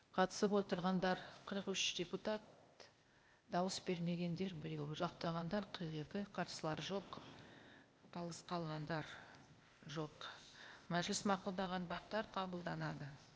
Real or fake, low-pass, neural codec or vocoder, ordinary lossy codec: fake; none; codec, 16 kHz, 0.8 kbps, ZipCodec; none